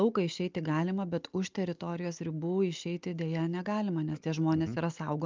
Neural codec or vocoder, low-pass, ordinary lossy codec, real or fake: none; 7.2 kHz; Opus, 24 kbps; real